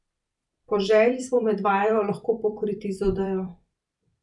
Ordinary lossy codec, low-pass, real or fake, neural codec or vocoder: none; 10.8 kHz; fake; vocoder, 44.1 kHz, 128 mel bands every 256 samples, BigVGAN v2